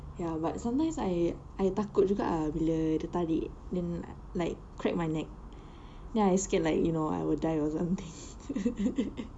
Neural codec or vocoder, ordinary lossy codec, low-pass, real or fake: none; none; 9.9 kHz; real